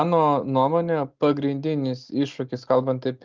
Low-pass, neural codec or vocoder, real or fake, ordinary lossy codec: 7.2 kHz; none; real; Opus, 32 kbps